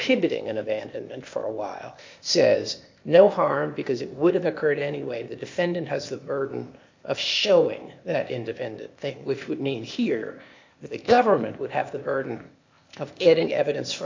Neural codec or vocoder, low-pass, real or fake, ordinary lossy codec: codec, 16 kHz, 0.8 kbps, ZipCodec; 7.2 kHz; fake; MP3, 48 kbps